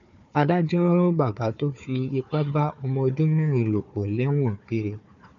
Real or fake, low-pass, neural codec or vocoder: fake; 7.2 kHz; codec, 16 kHz, 4 kbps, FunCodec, trained on Chinese and English, 50 frames a second